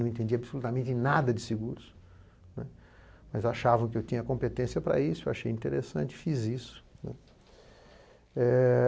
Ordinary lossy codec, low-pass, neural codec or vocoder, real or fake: none; none; none; real